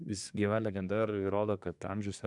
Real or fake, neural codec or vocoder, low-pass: fake; codec, 24 kHz, 1 kbps, SNAC; 10.8 kHz